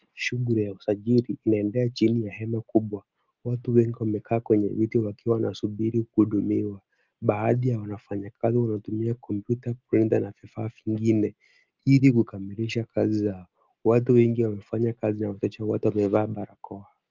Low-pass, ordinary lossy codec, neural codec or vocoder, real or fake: 7.2 kHz; Opus, 32 kbps; none; real